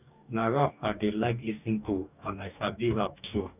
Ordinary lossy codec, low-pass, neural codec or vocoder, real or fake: none; 3.6 kHz; codec, 44.1 kHz, 2.6 kbps, DAC; fake